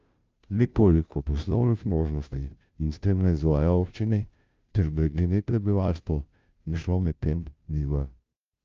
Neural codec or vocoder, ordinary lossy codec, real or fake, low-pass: codec, 16 kHz, 0.5 kbps, FunCodec, trained on Chinese and English, 25 frames a second; Opus, 24 kbps; fake; 7.2 kHz